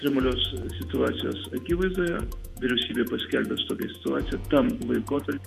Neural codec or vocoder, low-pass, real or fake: vocoder, 48 kHz, 128 mel bands, Vocos; 14.4 kHz; fake